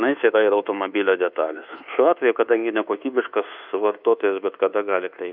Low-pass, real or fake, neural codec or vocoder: 5.4 kHz; fake; codec, 24 kHz, 1.2 kbps, DualCodec